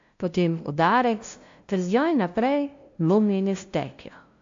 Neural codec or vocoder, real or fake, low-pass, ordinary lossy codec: codec, 16 kHz, 0.5 kbps, FunCodec, trained on LibriTTS, 25 frames a second; fake; 7.2 kHz; AAC, 48 kbps